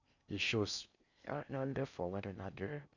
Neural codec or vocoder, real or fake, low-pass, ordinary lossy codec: codec, 16 kHz in and 24 kHz out, 0.6 kbps, FocalCodec, streaming, 2048 codes; fake; 7.2 kHz; none